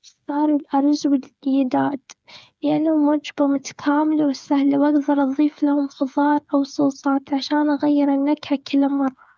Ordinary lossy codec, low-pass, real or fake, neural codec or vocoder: none; none; fake; codec, 16 kHz, 8 kbps, FreqCodec, smaller model